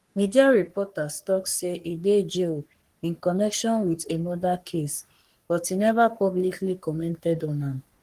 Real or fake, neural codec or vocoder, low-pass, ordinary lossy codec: fake; codec, 44.1 kHz, 3.4 kbps, Pupu-Codec; 14.4 kHz; Opus, 24 kbps